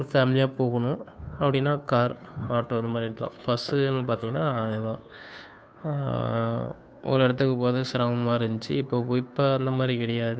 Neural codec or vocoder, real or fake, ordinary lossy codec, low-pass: codec, 16 kHz, 2 kbps, FunCodec, trained on Chinese and English, 25 frames a second; fake; none; none